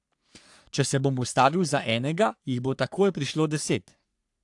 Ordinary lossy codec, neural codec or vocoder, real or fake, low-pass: AAC, 64 kbps; codec, 44.1 kHz, 3.4 kbps, Pupu-Codec; fake; 10.8 kHz